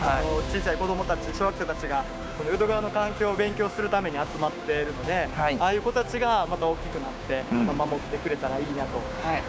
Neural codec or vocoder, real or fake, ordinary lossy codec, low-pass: codec, 16 kHz, 6 kbps, DAC; fake; none; none